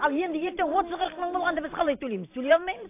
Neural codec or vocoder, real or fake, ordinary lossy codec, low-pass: vocoder, 44.1 kHz, 128 mel bands every 512 samples, BigVGAN v2; fake; AAC, 24 kbps; 3.6 kHz